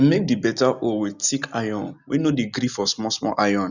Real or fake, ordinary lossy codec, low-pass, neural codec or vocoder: real; none; 7.2 kHz; none